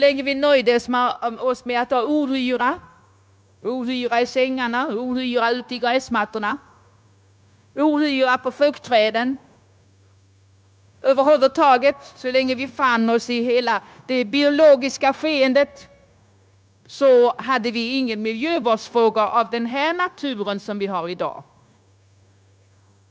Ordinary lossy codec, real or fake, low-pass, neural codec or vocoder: none; fake; none; codec, 16 kHz, 0.9 kbps, LongCat-Audio-Codec